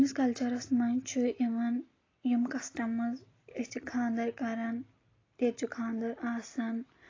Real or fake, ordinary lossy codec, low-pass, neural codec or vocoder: real; AAC, 32 kbps; 7.2 kHz; none